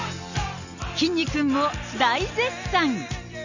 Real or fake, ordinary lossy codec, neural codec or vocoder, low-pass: real; none; none; 7.2 kHz